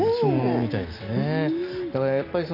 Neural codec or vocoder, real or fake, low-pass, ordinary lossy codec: none; real; 5.4 kHz; none